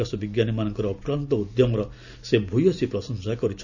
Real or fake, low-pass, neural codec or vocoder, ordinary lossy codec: real; 7.2 kHz; none; none